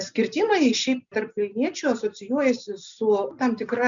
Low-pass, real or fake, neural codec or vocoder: 7.2 kHz; real; none